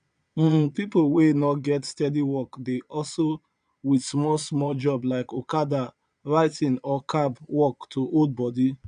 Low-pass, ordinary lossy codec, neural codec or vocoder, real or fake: 9.9 kHz; none; vocoder, 22.05 kHz, 80 mel bands, Vocos; fake